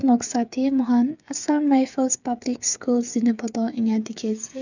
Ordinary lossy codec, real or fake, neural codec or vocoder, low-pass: none; fake; codec, 16 kHz, 8 kbps, FreqCodec, smaller model; 7.2 kHz